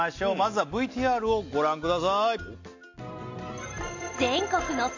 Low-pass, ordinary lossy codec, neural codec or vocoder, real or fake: 7.2 kHz; AAC, 48 kbps; none; real